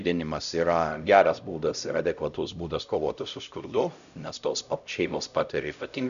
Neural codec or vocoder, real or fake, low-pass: codec, 16 kHz, 0.5 kbps, X-Codec, HuBERT features, trained on LibriSpeech; fake; 7.2 kHz